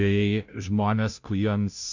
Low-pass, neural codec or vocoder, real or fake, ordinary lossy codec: 7.2 kHz; codec, 16 kHz, 0.5 kbps, FunCodec, trained on Chinese and English, 25 frames a second; fake; Opus, 64 kbps